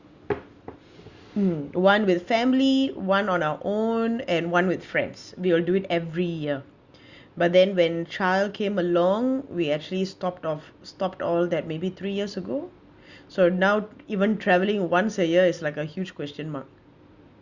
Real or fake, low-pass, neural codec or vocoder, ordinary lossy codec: real; 7.2 kHz; none; Opus, 64 kbps